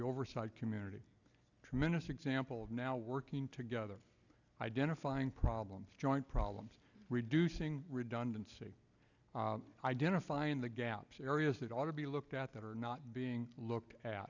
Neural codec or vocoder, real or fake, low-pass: none; real; 7.2 kHz